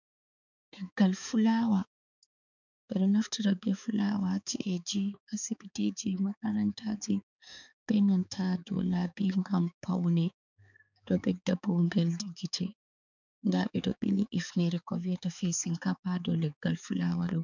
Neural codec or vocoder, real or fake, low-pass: codec, 16 kHz, 4 kbps, X-Codec, HuBERT features, trained on balanced general audio; fake; 7.2 kHz